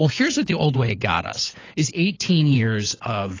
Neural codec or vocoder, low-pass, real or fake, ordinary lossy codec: codec, 16 kHz, 4 kbps, FunCodec, trained on LibriTTS, 50 frames a second; 7.2 kHz; fake; AAC, 32 kbps